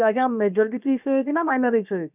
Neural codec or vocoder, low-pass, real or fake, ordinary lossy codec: codec, 16 kHz, about 1 kbps, DyCAST, with the encoder's durations; 3.6 kHz; fake; none